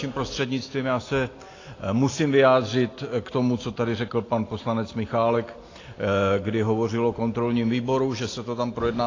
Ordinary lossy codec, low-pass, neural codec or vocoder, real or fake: AAC, 32 kbps; 7.2 kHz; none; real